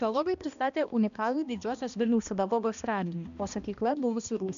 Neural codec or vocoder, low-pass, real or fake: codec, 16 kHz, 1 kbps, X-Codec, HuBERT features, trained on balanced general audio; 7.2 kHz; fake